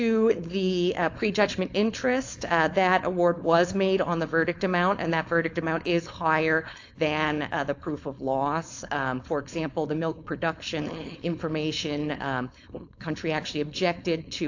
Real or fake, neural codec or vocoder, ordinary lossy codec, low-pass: fake; codec, 16 kHz, 4.8 kbps, FACodec; AAC, 48 kbps; 7.2 kHz